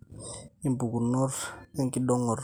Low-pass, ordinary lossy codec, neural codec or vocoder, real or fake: none; none; none; real